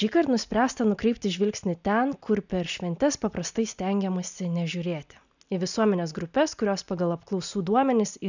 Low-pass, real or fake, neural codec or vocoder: 7.2 kHz; real; none